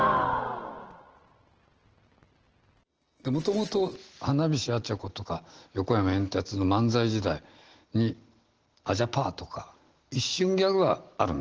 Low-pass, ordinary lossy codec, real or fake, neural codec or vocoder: 7.2 kHz; Opus, 16 kbps; real; none